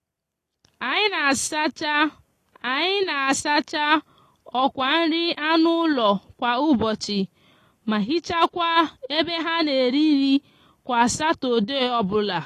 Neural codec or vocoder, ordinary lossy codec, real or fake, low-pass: none; AAC, 48 kbps; real; 14.4 kHz